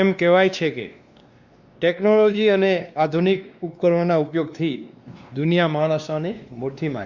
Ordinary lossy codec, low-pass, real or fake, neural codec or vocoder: Opus, 64 kbps; 7.2 kHz; fake; codec, 16 kHz, 2 kbps, X-Codec, WavLM features, trained on Multilingual LibriSpeech